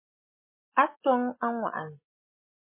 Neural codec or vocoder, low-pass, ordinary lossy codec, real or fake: none; 3.6 kHz; MP3, 16 kbps; real